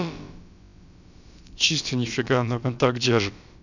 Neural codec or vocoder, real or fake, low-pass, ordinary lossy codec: codec, 16 kHz, about 1 kbps, DyCAST, with the encoder's durations; fake; 7.2 kHz; none